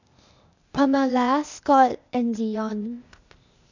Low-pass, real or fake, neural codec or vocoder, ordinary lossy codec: 7.2 kHz; fake; codec, 16 kHz, 0.8 kbps, ZipCodec; none